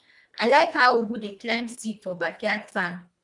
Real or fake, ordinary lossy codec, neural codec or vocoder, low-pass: fake; none; codec, 24 kHz, 1.5 kbps, HILCodec; 10.8 kHz